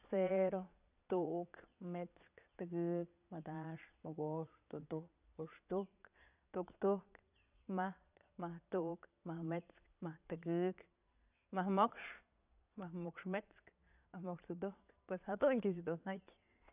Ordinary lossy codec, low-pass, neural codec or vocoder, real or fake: none; 3.6 kHz; vocoder, 22.05 kHz, 80 mel bands, Vocos; fake